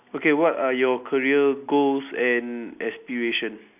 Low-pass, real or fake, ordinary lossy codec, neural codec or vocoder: 3.6 kHz; real; none; none